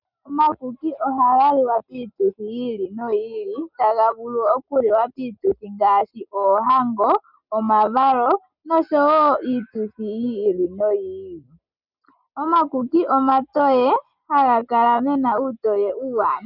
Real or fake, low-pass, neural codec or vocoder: real; 5.4 kHz; none